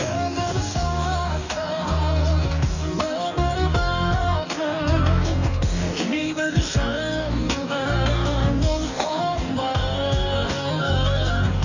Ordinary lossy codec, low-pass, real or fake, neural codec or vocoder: none; 7.2 kHz; fake; codec, 44.1 kHz, 2.6 kbps, DAC